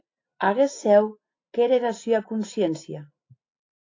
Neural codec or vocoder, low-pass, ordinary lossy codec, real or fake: none; 7.2 kHz; AAC, 32 kbps; real